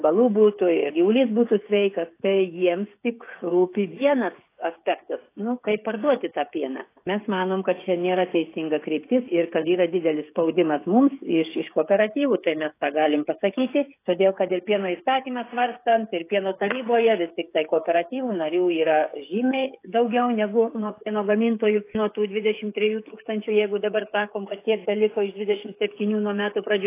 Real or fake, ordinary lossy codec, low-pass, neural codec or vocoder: fake; AAC, 24 kbps; 3.6 kHz; codec, 16 kHz, 16 kbps, FreqCodec, smaller model